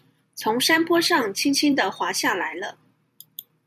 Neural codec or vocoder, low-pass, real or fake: vocoder, 44.1 kHz, 128 mel bands every 512 samples, BigVGAN v2; 14.4 kHz; fake